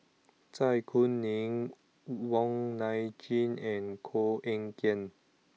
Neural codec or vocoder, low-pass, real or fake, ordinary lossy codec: none; none; real; none